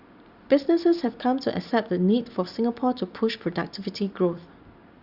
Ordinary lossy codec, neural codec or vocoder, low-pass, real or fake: Opus, 64 kbps; none; 5.4 kHz; real